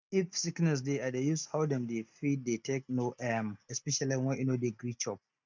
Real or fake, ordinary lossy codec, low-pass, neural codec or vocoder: real; none; 7.2 kHz; none